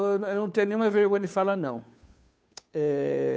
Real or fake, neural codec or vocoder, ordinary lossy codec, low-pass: fake; codec, 16 kHz, 2 kbps, FunCodec, trained on Chinese and English, 25 frames a second; none; none